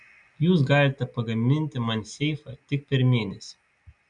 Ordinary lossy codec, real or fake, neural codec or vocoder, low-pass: MP3, 96 kbps; real; none; 9.9 kHz